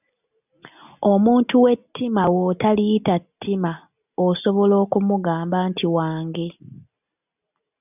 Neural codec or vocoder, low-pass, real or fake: none; 3.6 kHz; real